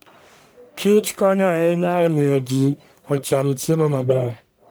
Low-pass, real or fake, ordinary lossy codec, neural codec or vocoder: none; fake; none; codec, 44.1 kHz, 1.7 kbps, Pupu-Codec